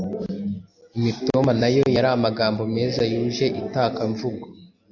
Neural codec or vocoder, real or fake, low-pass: none; real; 7.2 kHz